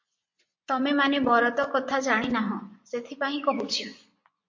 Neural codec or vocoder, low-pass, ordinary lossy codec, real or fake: vocoder, 24 kHz, 100 mel bands, Vocos; 7.2 kHz; MP3, 48 kbps; fake